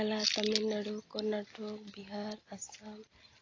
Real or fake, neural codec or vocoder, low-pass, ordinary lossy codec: real; none; 7.2 kHz; AAC, 32 kbps